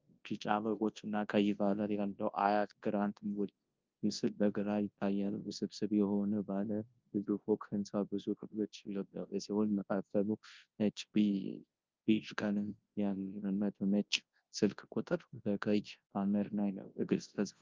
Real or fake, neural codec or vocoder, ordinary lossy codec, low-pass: fake; codec, 24 kHz, 0.9 kbps, WavTokenizer, large speech release; Opus, 32 kbps; 7.2 kHz